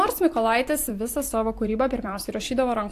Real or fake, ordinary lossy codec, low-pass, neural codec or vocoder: fake; AAC, 64 kbps; 14.4 kHz; vocoder, 44.1 kHz, 128 mel bands every 256 samples, BigVGAN v2